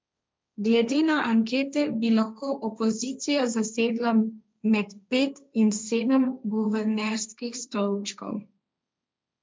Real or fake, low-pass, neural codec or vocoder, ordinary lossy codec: fake; none; codec, 16 kHz, 1.1 kbps, Voila-Tokenizer; none